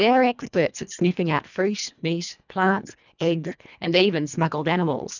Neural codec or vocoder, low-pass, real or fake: codec, 24 kHz, 1.5 kbps, HILCodec; 7.2 kHz; fake